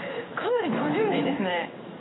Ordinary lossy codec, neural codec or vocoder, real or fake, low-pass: AAC, 16 kbps; codec, 16 kHz in and 24 kHz out, 1 kbps, XY-Tokenizer; fake; 7.2 kHz